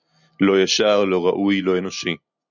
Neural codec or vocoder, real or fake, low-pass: none; real; 7.2 kHz